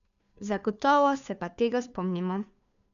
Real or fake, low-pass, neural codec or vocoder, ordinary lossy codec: fake; 7.2 kHz; codec, 16 kHz, 2 kbps, FunCodec, trained on Chinese and English, 25 frames a second; none